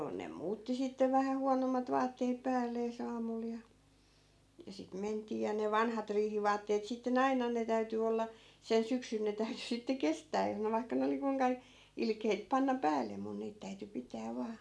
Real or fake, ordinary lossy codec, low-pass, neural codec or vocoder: real; none; none; none